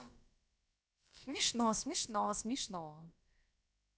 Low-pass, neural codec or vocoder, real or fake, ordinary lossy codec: none; codec, 16 kHz, about 1 kbps, DyCAST, with the encoder's durations; fake; none